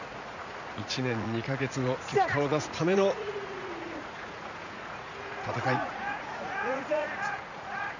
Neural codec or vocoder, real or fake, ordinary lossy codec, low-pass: vocoder, 44.1 kHz, 80 mel bands, Vocos; fake; none; 7.2 kHz